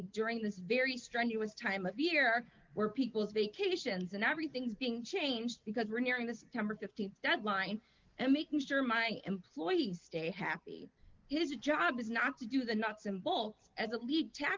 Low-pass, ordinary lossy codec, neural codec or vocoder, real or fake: 7.2 kHz; Opus, 24 kbps; none; real